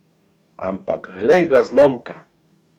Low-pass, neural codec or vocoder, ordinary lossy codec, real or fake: 19.8 kHz; codec, 44.1 kHz, 2.6 kbps, DAC; none; fake